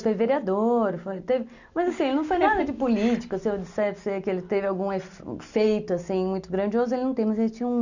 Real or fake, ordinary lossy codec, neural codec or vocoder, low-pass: real; none; none; 7.2 kHz